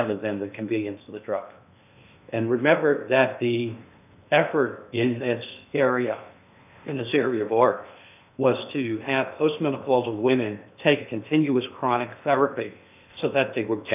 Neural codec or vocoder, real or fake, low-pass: codec, 16 kHz in and 24 kHz out, 0.8 kbps, FocalCodec, streaming, 65536 codes; fake; 3.6 kHz